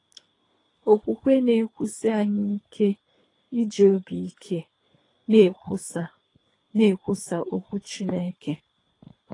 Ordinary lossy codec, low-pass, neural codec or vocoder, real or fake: AAC, 32 kbps; 10.8 kHz; codec, 24 kHz, 3 kbps, HILCodec; fake